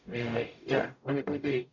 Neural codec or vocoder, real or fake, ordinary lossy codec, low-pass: codec, 44.1 kHz, 0.9 kbps, DAC; fake; none; 7.2 kHz